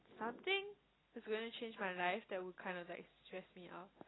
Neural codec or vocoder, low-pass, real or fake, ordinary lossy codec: none; 7.2 kHz; real; AAC, 16 kbps